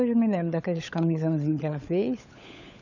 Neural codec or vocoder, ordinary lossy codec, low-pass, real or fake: codec, 16 kHz, 16 kbps, FunCodec, trained on LibriTTS, 50 frames a second; none; 7.2 kHz; fake